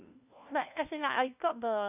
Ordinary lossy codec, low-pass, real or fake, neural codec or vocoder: none; 3.6 kHz; fake; codec, 16 kHz, 1 kbps, FunCodec, trained on LibriTTS, 50 frames a second